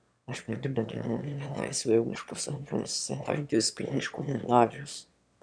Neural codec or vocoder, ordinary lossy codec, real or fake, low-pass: autoencoder, 22.05 kHz, a latent of 192 numbers a frame, VITS, trained on one speaker; MP3, 96 kbps; fake; 9.9 kHz